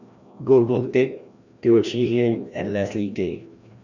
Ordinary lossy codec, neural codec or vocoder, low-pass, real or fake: none; codec, 16 kHz, 1 kbps, FreqCodec, larger model; 7.2 kHz; fake